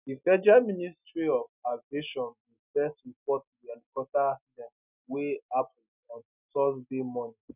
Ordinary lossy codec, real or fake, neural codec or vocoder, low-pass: none; real; none; 3.6 kHz